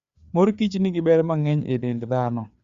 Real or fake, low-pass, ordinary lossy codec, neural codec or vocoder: fake; 7.2 kHz; Opus, 64 kbps; codec, 16 kHz, 4 kbps, FreqCodec, larger model